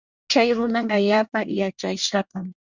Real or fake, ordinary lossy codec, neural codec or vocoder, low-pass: fake; Opus, 64 kbps; codec, 24 kHz, 1 kbps, SNAC; 7.2 kHz